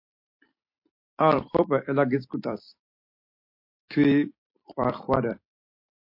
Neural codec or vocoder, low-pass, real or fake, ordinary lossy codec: vocoder, 44.1 kHz, 128 mel bands every 256 samples, BigVGAN v2; 5.4 kHz; fake; MP3, 32 kbps